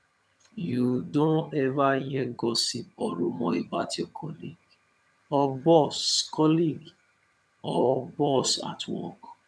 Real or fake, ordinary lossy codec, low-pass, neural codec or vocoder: fake; none; none; vocoder, 22.05 kHz, 80 mel bands, HiFi-GAN